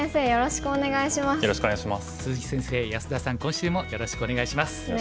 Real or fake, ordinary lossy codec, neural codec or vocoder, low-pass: real; none; none; none